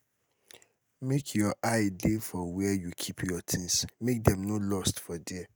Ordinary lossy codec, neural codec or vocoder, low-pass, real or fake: none; none; none; real